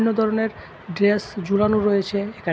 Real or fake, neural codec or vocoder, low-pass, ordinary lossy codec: real; none; none; none